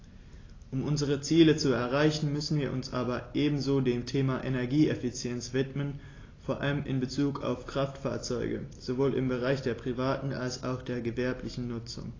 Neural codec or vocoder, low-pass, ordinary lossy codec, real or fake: none; 7.2 kHz; AAC, 32 kbps; real